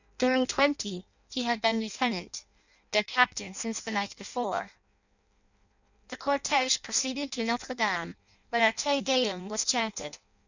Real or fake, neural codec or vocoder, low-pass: fake; codec, 16 kHz in and 24 kHz out, 0.6 kbps, FireRedTTS-2 codec; 7.2 kHz